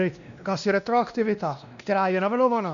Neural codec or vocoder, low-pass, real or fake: codec, 16 kHz, 1 kbps, X-Codec, WavLM features, trained on Multilingual LibriSpeech; 7.2 kHz; fake